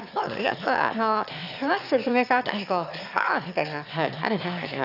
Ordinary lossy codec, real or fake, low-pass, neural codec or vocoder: none; fake; 5.4 kHz; autoencoder, 22.05 kHz, a latent of 192 numbers a frame, VITS, trained on one speaker